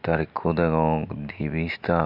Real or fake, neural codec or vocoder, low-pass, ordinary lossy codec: real; none; 5.4 kHz; MP3, 48 kbps